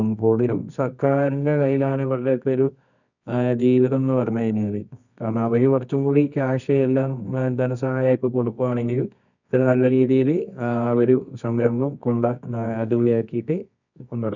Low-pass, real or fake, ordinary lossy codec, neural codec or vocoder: 7.2 kHz; fake; none; codec, 24 kHz, 0.9 kbps, WavTokenizer, medium music audio release